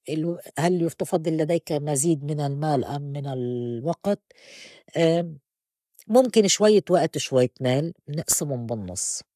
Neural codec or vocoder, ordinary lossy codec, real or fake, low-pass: codec, 44.1 kHz, 7.8 kbps, Pupu-Codec; none; fake; 14.4 kHz